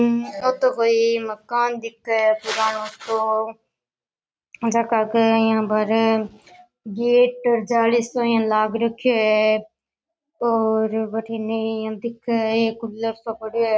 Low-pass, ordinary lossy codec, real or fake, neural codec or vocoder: none; none; real; none